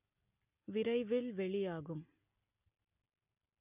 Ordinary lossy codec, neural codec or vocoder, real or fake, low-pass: none; none; real; 3.6 kHz